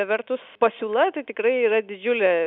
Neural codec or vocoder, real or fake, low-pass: none; real; 5.4 kHz